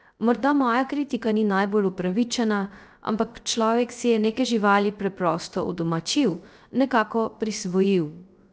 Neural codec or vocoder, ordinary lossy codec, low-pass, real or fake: codec, 16 kHz, 0.3 kbps, FocalCodec; none; none; fake